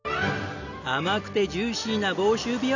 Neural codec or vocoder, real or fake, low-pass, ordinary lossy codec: none; real; 7.2 kHz; none